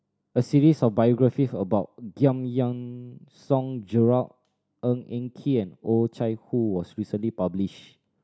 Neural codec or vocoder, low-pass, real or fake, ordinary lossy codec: none; none; real; none